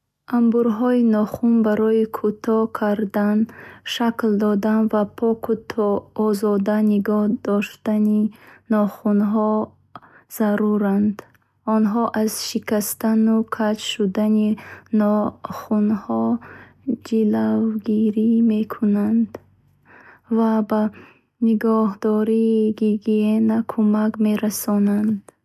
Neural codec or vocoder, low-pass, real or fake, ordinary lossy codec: none; 14.4 kHz; real; none